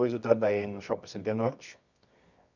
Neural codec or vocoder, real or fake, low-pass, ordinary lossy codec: codec, 24 kHz, 0.9 kbps, WavTokenizer, medium music audio release; fake; 7.2 kHz; none